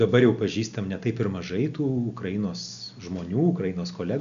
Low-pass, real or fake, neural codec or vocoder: 7.2 kHz; real; none